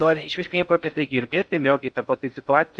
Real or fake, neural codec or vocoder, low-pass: fake; codec, 16 kHz in and 24 kHz out, 0.6 kbps, FocalCodec, streaming, 4096 codes; 9.9 kHz